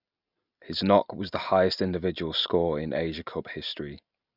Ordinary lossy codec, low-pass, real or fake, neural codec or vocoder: none; 5.4 kHz; real; none